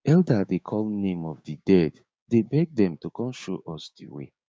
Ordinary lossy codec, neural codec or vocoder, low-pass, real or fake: none; codec, 16 kHz, 6 kbps, DAC; none; fake